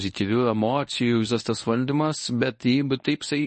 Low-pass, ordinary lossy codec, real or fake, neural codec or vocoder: 10.8 kHz; MP3, 32 kbps; fake; codec, 24 kHz, 0.9 kbps, WavTokenizer, medium speech release version 1